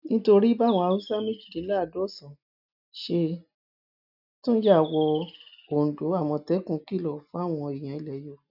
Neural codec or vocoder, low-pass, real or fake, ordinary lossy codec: none; 5.4 kHz; real; none